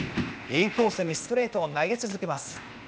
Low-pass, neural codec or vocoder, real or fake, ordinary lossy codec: none; codec, 16 kHz, 0.8 kbps, ZipCodec; fake; none